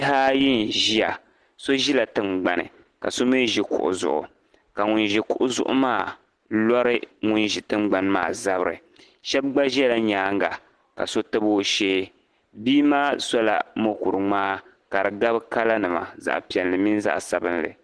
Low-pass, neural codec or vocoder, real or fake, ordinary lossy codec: 10.8 kHz; none; real; Opus, 16 kbps